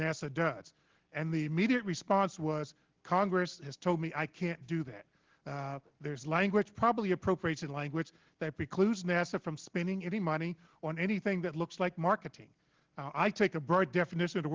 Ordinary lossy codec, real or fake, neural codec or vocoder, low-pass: Opus, 16 kbps; real; none; 7.2 kHz